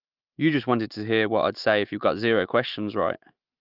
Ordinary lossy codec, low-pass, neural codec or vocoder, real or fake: Opus, 24 kbps; 5.4 kHz; autoencoder, 48 kHz, 128 numbers a frame, DAC-VAE, trained on Japanese speech; fake